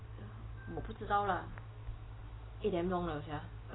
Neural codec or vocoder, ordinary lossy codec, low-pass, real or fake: none; AAC, 16 kbps; 7.2 kHz; real